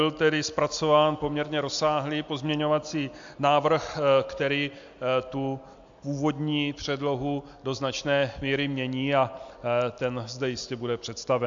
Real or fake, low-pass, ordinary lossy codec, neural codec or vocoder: real; 7.2 kHz; AAC, 64 kbps; none